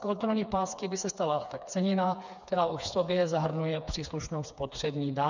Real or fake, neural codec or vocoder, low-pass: fake; codec, 16 kHz, 4 kbps, FreqCodec, smaller model; 7.2 kHz